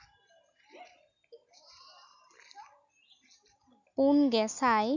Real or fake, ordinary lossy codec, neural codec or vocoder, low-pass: real; none; none; 7.2 kHz